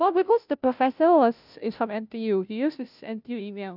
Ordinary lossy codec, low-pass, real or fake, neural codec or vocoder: none; 5.4 kHz; fake; codec, 16 kHz, 0.5 kbps, FunCodec, trained on Chinese and English, 25 frames a second